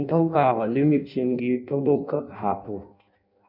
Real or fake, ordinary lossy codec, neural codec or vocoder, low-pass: fake; MP3, 48 kbps; codec, 16 kHz in and 24 kHz out, 0.6 kbps, FireRedTTS-2 codec; 5.4 kHz